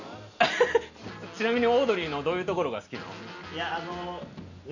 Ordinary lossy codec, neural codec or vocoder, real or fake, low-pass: none; none; real; 7.2 kHz